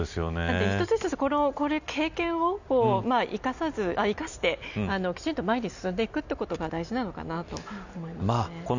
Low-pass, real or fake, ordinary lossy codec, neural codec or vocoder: 7.2 kHz; real; none; none